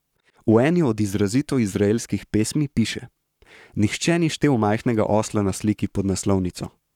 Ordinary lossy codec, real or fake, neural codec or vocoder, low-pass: none; fake; codec, 44.1 kHz, 7.8 kbps, Pupu-Codec; 19.8 kHz